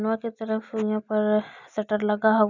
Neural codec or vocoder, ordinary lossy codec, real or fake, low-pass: none; none; real; 7.2 kHz